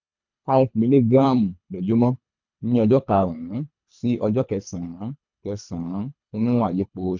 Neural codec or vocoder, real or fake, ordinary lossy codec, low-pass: codec, 24 kHz, 3 kbps, HILCodec; fake; none; 7.2 kHz